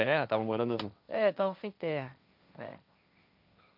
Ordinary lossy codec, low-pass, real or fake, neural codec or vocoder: none; 5.4 kHz; fake; codec, 16 kHz, 1.1 kbps, Voila-Tokenizer